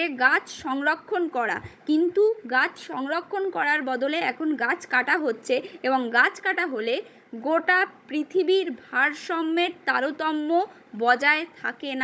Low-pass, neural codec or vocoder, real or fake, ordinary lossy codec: none; codec, 16 kHz, 16 kbps, FunCodec, trained on Chinese and English, 50 frames a second; fake; none